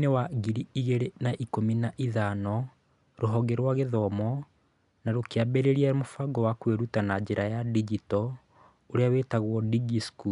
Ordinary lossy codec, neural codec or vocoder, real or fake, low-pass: none; none; real; 10.8 kHz